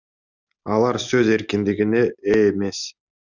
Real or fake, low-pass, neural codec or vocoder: real; 7.2 kHz; none